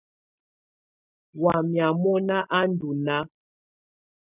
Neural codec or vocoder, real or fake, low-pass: none; real; 3.6 kHz